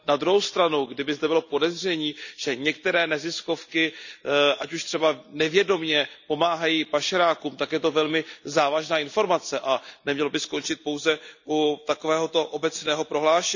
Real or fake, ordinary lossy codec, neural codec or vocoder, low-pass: real; none; none; 7.2 kHz